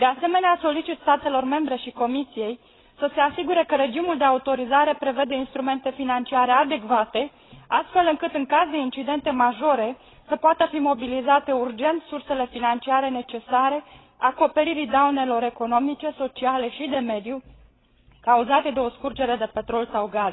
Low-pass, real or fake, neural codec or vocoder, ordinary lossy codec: 7.2 kHz; fake; codec, 16 kHz, 16 kbps, FreqCodec, larger model; AAC, 16 kbps